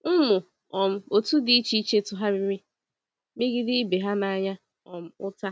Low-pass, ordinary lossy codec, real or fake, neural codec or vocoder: none; none; real; none